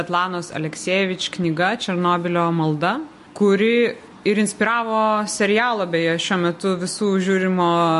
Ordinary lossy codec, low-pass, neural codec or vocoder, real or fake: MP3, 48 kbps; 14.4 kHz; none; real